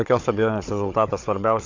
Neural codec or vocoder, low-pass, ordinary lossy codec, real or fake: codec, 16 kHz, 16 kbps, FunCodec, trained on Chinese and English, 50 frames a second; 7.2 kHz; MP3, 64 kbps; fake